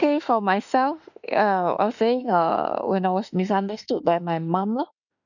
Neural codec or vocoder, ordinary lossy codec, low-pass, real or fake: autoencoder, 48 kHz, 32 numbers a frame, DAC-VAE, trained on Japanese speech; none; 7.2 kHz; fake